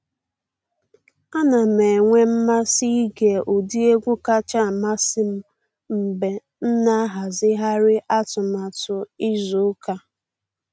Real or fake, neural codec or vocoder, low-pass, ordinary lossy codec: real; none; none; none